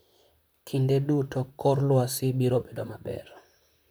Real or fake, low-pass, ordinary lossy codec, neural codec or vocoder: fake; none; none; vocoder, 44.1 kHz, 128 mel bands, Pupu-Vocoder